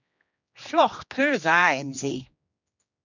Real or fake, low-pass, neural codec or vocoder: fake; 7.2 kHz; codec, 16 kHz, 2 kbps, X-Codec, HuBERT features, trained on general audio